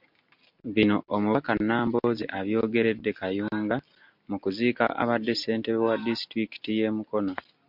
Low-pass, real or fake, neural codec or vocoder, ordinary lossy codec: 5.4 kHz; real; none; MP3, 48 kbps